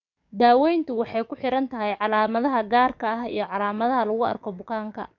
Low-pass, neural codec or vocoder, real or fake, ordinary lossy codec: 7.2 kHz; codec, 44.1 kHz, 7.8 kbps, DAC; fake; none